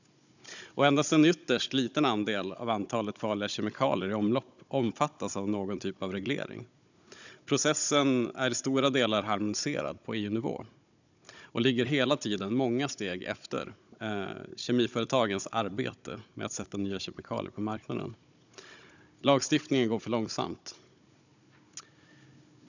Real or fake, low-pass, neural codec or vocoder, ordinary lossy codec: fake; 7.2 kHz; codec, 16 kHz, 16 kbps, FunCodec, trained on Chinese and English, 50 frames a second; none